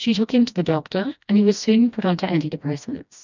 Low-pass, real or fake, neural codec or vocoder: 7.2 kHz; fake; codec, 16 kHz, 1 kbps, FreqCodec, smaller model